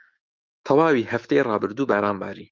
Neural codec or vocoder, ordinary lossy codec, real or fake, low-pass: autoencoder, 48 kHz, 128 numbers a frame, DAC-VAE, trained on Japanese speech; Opus, 24 kbps; fake; 7.2 kHz